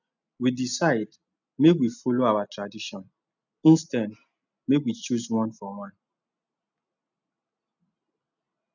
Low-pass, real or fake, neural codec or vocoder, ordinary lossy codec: 7.2 kHz; real; none; none